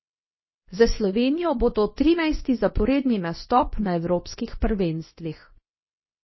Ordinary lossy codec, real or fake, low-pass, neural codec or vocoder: MP3, 24 kbps; fake; 7.2 kHz; codec, 24 kHz, 0.9 kbps, WavTokenizer, medium speech release version 2